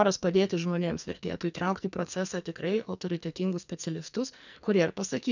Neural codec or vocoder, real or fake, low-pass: codec, 44.1 kHz, 2.6 kbps, SNAC; fake; 7.2 kHz